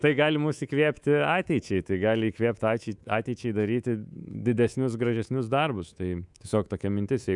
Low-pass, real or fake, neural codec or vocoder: 10.8 kHz; fake; codec, 24 kHz, 3.1 kbps, DualCodec